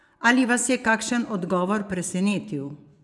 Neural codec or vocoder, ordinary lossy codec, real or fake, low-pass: vocoder, 24 kHz, 100 mel bands, Vocos; none; fake; none